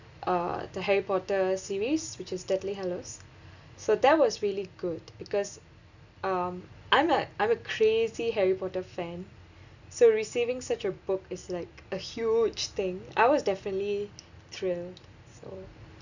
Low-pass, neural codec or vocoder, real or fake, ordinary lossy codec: 7.2 kHz; none; real; none